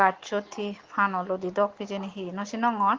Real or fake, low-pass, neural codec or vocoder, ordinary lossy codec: real; 7.2 kHz; none; Opus, 16 kbps